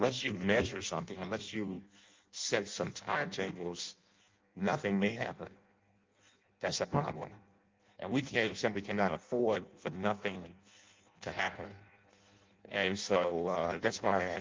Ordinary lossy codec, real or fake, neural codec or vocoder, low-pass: Opus, 32 kbps; fake; codec, 16 kHz in and 24 kHz out, 0.6 kbps, FireRedTTS-2 codec; 7.2 kHz